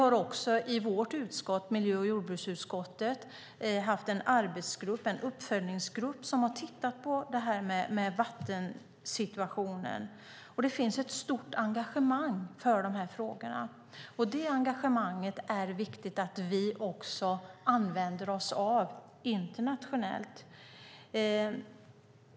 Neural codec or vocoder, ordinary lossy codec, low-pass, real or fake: none; none; none; real